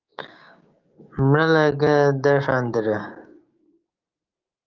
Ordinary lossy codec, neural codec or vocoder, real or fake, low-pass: Opus, 24 kbps; codec, 16 kHz, 6 kbps, DAC; fake; 7.2 kHz